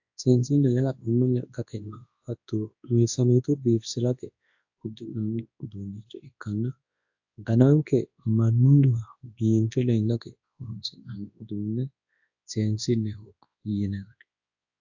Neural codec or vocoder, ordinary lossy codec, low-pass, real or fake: codec, 24 kHz, 0.9 kbps, WavTokenizer, large speech release; AAC, 48 kbps; 7.2 kHz; fake